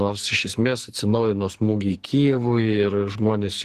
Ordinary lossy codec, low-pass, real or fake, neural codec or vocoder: Opus, 16 kbps; 14.4 kHz; fake; codec, 44.1 kHz, 2.6 kbps, SNAC